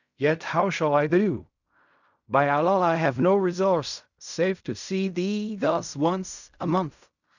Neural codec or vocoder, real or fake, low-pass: codec, 16 kHz in and 24 kHz out, 0.4 kbps, LongCat-Audio-Codec, fine tuned four codebook decoder; fake; 7.2 kHz